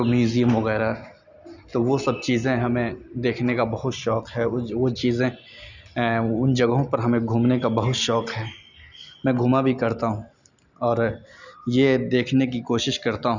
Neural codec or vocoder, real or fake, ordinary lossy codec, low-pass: none; real; none; 7.2 kHz